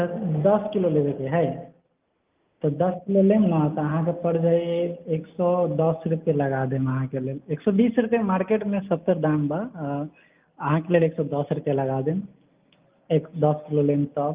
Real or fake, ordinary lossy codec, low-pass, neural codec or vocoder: real; Opus, 24 kbps; 3.6 kHz; none